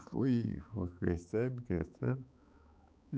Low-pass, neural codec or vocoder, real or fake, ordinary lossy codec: none; codec, 16 kHz, 4 kbps, X-Codec, HuBERT features, trained on balanced general audio; fake; none